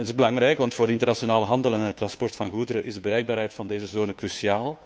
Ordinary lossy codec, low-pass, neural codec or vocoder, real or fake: none; none; codec, 16 kHz, 2 kbps, FunCodec, trained on Chinese and English, 25 frames a second; fake